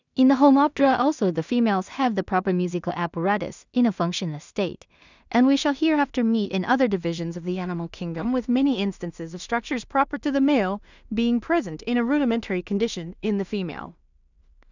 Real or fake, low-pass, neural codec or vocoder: fake; 7.2 kHz; codec, 16 kHz in and 24 kHz out, 0.4 kbps, LongCat-Audio-Codec, two codebook decoder